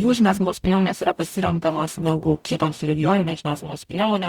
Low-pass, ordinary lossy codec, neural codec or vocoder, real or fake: 19.8 kHz; Opus, 64 kbps; codec, 44.1 kHz, 0.9 kbps, DAC; fake